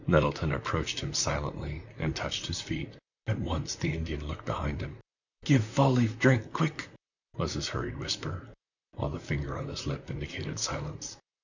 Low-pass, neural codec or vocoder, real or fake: 7.2 kHz; vocoder, 44.1 kHz, 128 mel bands, Pupu-Vocoder; fake